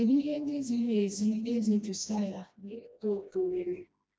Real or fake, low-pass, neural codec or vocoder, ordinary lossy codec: fake; none; codec, 16 kHz, 1 kbps, FreqCodec, smaller model; none